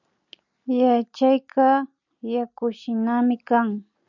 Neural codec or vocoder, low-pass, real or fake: none; 7.2 kHz; real